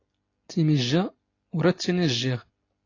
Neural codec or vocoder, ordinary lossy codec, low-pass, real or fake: none; AAC, 32 kbps; 7.2 kHz; real